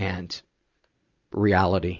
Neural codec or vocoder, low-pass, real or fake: none; 7.2 kHz; real